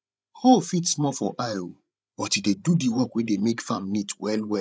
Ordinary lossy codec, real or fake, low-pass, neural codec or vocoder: none; fake; none; codec, 16 kHz, 16 kbps, FreqCodec, larger model